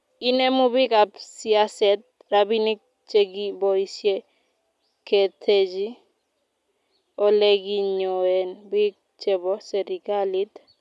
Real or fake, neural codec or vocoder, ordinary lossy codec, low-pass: real; none; none; none